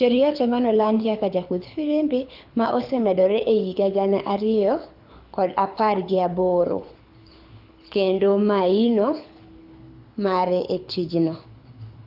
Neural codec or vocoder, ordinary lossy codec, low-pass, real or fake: codec, 24 kHz, 6 kbps, HILCodec; Opus, 64 kbps; 5.4 kHz; fake